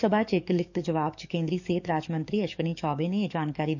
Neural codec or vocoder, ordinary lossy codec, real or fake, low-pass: codec, 44.1 kHz, 7.8 kbps, DAC; none; fake; 7.2 kHz